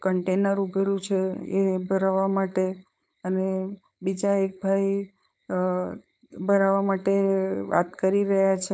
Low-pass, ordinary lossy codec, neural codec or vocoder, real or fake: none; none; codec, 16 kHz, 4.8 kbps, FACodec; fake